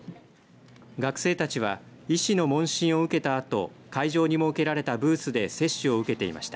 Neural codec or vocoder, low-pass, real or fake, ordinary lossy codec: none; none; real; none